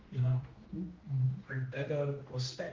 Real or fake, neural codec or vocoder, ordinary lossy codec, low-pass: fake; codec, 16 kHz, 1 kbps, X-Codec, HuBERT features, trained on general audio; Opus, 32 kbps; 7.2 kHz